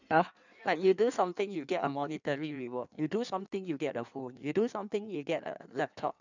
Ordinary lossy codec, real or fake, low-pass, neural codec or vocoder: none; fake; 7.2 kHz; codec, 16 kHz in and 24 kHz out, 1.1 kbps, FireRedTTS-2 codec